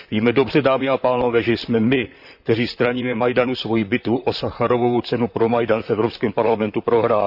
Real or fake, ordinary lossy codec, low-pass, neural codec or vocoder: fake; none; 5.4 kHz; vocoder, 44.1 kHz, 128 mel bands, Pupu-Vocoder